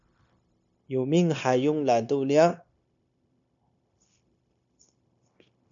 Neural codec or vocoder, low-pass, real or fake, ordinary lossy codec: codec, 16 kHz, 0.9 kbps, LongCat-Audio-Codec; 7.2 kHz; fake; AAC, 64 kbps